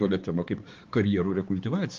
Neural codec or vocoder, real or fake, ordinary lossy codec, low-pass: codec, 16 kHz, 4 kbps, X-Codec, HuBERT features, trained on balanced general audio; fake; Opus, 24 kbps; 7.2 kHz